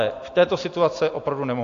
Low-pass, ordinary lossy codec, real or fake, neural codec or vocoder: 7.2 kHz; AAC, 48 kbps; real; none